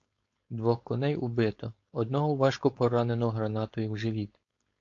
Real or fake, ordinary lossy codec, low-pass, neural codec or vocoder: fake; AAC, 48 kbps; 7.2 kHz; codec, 16 kHz, 4.8 kbps, FACodec